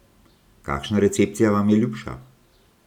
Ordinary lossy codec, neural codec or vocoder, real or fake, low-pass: none; none; real; 19.8 kHz